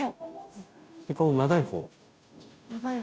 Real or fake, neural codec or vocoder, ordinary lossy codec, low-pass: fake; codec, 16 kHz, 0.5 kbps, FunCodec, trained on Chinese and English, 25 frames a second; none; none